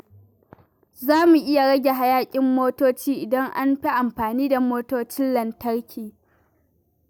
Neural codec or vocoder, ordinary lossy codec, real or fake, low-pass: none; none; real; none